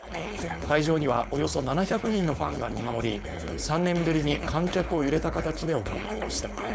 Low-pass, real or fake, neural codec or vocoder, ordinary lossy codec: none; fake; codec, 16 kHz, 4.8 kbps, FACodec; none